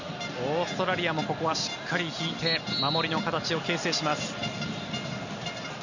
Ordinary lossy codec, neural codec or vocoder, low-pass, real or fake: none; none; 7.2 kHz; real